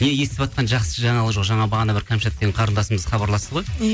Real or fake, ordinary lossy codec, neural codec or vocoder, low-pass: real; none; none; none